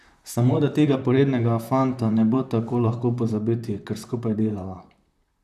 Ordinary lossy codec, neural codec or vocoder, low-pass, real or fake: none; vocoder, 44.1 kHz, 128 mel bands, Pupu-Vocoder; 14.4 kHz; fake